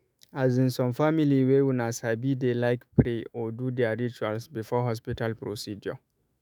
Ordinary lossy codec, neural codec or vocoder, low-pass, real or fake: none; autoencoder, 48 kHz, 128 numbers a frame, DAC-VAE, trained on Japanese speech; none; fake